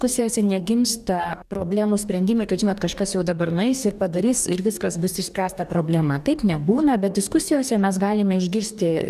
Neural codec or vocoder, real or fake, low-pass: codec, 44.1 kHz, 2.6 kbps, DAC; fake; 14.4 kHz